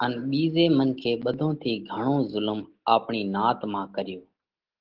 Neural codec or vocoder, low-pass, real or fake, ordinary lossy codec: none; 5.4 kHz; real; Opus, 16 kbps